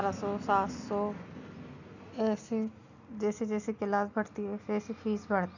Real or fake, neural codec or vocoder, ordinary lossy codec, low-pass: real; none; none; 7.2 kHz